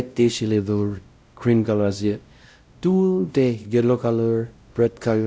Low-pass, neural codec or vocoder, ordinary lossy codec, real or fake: none; codec, 16 kHz, 0.5 kbps, X-Codec, WavLM features, trained on Multilingual LibriSpeech; none; fake